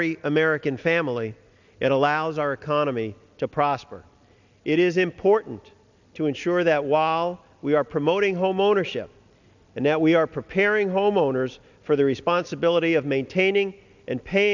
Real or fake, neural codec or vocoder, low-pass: real; none; 7.2 kHz